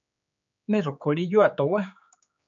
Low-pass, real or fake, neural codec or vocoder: 7.2 kHz; fake; codec, 16 kHz, 4 kbps, X-Codec, HuBERT features, trained on general audio